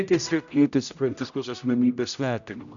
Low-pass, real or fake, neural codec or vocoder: 7.2 kHz; fake; codec, 16 kHz, 0.5 kbps, X-Codec, HuBERT features, trained on general audio